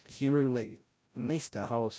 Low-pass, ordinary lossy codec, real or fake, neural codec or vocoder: none; none; fake; codec, 16 kHz, 0.5 kbps, FreqCodec, larger model